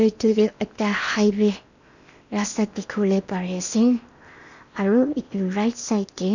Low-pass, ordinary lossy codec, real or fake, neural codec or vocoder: 7.2 kHz; none; fake; codec, 16 kHz in and 24 kHz out, 0.8 kbps, FocalCodec, streaming, 65536 codes